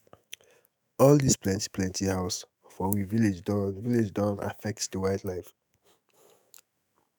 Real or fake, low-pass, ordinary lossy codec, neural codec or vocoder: fake; none; none; autoencoder, 48 kHz, 128 numbers a frame, DAC-VAE, trained on Japanese speech